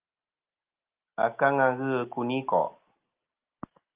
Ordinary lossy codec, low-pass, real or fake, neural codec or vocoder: Opus, 32 kbps; 3.6 kHz; real; none